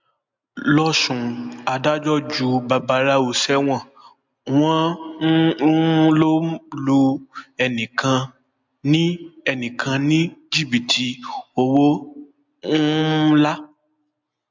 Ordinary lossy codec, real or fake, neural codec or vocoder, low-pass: MP3, 64 kbps; real; none; 7.2 kHz